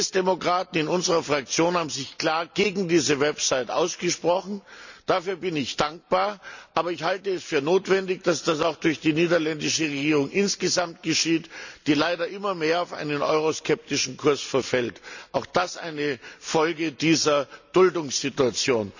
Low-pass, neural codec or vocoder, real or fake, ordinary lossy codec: 7.2 kHz; none; real; none